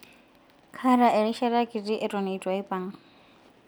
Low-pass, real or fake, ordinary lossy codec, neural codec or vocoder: none; real; none; none